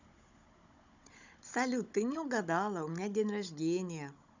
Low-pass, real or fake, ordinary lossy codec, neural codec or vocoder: 7.2 kHz; fake; none; codec, 16 kHz, 16 kbps, FunCodec, trained on Chinese and English, 50 frames a second